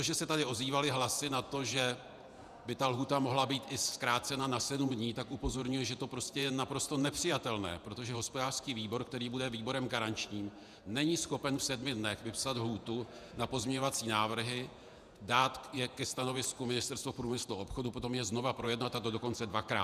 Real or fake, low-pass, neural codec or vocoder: fake; 14.4 kHz; vocoder, 48 kHz, 128 mel bands, Vocos